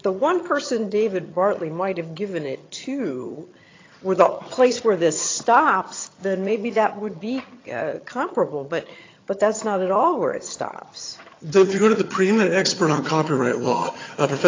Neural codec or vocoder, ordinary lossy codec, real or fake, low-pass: vocoder, 22.05 kHz, 80 mel bands, HiFi-GAN; AAC, 32 kbps; fake; 7.2 kHz